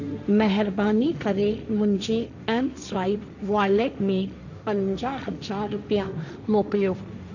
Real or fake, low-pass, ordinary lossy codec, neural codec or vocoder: fake; 7.2 kHz; none; codec, 16 kHz, 1.1 kbps, Voila-Tokenizer